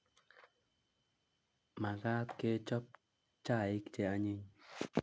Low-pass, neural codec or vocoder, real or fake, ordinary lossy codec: none; none; real; none